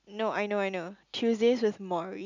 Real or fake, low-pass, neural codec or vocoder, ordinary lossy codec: real; 7.2 kHz; none; none